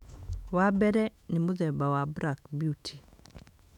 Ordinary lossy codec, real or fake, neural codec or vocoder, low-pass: none; fake; autoencoder, 48 kHz, 128 numbers a frame, DAC-VAE, trained on Japanese speech; 19.8 kHz